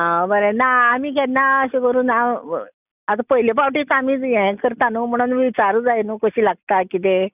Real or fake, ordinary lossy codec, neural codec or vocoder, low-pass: real; none; none; 3.6 kHz